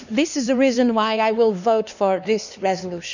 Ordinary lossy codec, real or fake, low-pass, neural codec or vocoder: none; fake; 7.2 kHz; codec, 16 kHz, 2 kbps, X-Codec, HuBERT features, trained on LibriSpeech